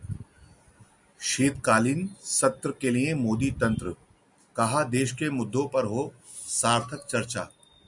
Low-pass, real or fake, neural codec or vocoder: 10.8 kHz; real; none